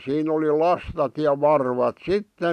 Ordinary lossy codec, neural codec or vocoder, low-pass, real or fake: none; none; 14.4 kHz; real